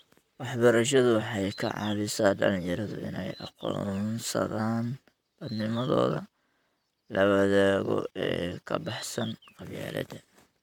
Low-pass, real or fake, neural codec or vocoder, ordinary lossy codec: 19.8 kHz; fake; vocoder, 44.1 kHz, 128 mel bands, Pupu-Vocoder; MP3, 96 kbps